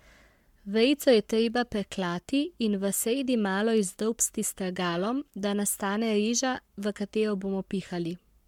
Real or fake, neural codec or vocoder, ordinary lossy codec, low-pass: fake; codec, 44.1 kHz, 7.8 kbps, Pupu-Codec; MP3, 96 kbps; 19.8 kHz